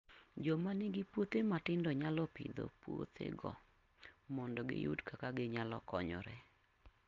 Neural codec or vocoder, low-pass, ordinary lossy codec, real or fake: none; 7.2 kHz; Opus, 32 kbps; real